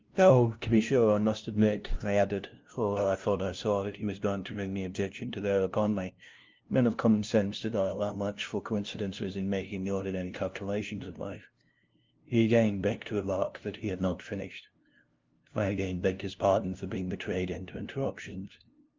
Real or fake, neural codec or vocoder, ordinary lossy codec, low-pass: fake; codec, 16 kHz, 0.5 kbps, FunCodec, trained on LibriTTS, 25 frames a second; Opus, 32 kbps; 7.2 kHz